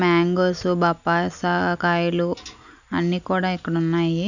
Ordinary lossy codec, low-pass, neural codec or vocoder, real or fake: none; 7.2 kHz; none; real